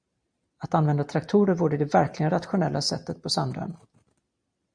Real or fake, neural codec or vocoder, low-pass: real; none; 9.9 kHz